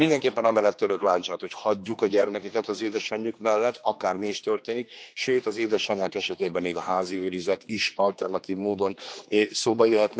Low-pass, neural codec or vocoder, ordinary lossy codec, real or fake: none; codec, 16 kHz, 2 kbps, X-Codec, HuBERT features, trained on general audio; none; fake